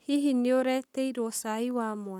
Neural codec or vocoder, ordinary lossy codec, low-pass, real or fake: codec, 44.1 kHz, 7.8 kbps, Pupu-Codec; none; 19.8 kHz; fake